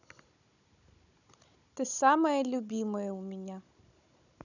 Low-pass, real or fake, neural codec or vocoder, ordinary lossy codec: 7.2 kHz; fake; codec, 16 kHz, 16 kbps, FunCodec, trained on Chinese and English, 50 frames a second; none